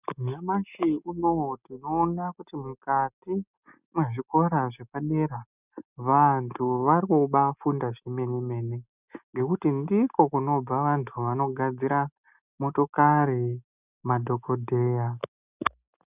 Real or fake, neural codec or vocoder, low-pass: real; none; 3.6 kHz